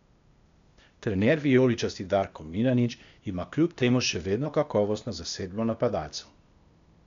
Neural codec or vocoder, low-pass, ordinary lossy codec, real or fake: codec, 16 kHz, 0.8 kbps, ZipCodec; 7.2 kHz; MP3, 64 kbps; fake